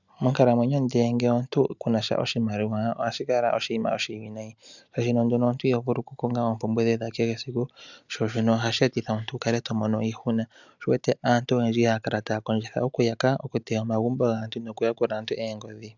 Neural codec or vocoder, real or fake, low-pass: none; real; 7.2 kHz